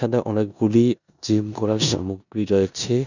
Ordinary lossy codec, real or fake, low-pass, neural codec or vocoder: none; fake; 7.2 kHz; codec, 16 kHz in and 24 kHz out, 0.9 kbps, LongCat-Audio-Codec, four codebook decoder